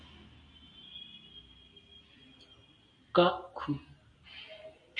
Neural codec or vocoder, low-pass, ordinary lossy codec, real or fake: none; 9.9 kHz; MP3, 96 kbps; real